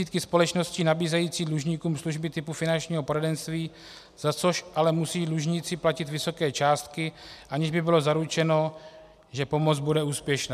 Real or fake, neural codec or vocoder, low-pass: real; none; 14.4 kHz